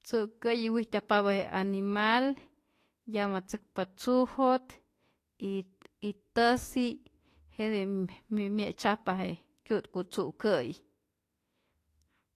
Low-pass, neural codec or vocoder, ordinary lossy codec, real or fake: 14.4 kHz; autoencoder, 48 kHz, 32 numbers a frame, DAC-VAE, trained on Japanese speech; AAC, 48 kbps; fake